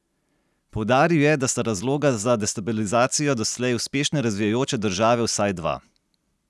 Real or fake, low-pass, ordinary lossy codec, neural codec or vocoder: real; none; none; none